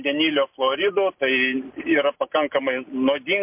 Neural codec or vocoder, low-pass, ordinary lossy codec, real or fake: none; 3.6 kHz; MP3, 32 kbps; real